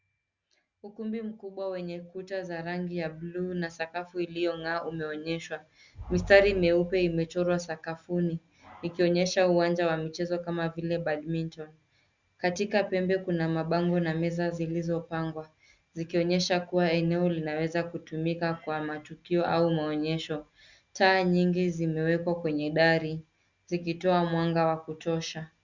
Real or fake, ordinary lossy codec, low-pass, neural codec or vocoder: real; Opus, 64 kbps; 7.2 kHz; none